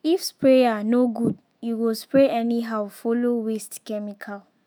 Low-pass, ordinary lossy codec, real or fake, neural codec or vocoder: none; none; fake; autoencoder, 48 kHz, 128 numbers a frame, DAC-VAE, trained on Japanese speech